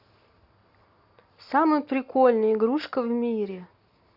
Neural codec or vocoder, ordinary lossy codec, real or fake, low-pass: none; Opus, 64 kbps; real; 5.4 kHz